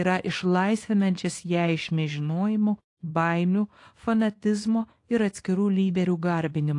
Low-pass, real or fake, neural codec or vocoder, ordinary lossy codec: 10.8 kHz; fake; codec, 24 kHz, 0.9 kbps, WavTokenizer, small release; AAC, 48 kbps